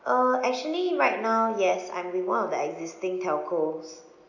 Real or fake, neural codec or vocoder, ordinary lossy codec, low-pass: real; none; none; 7.2 kHz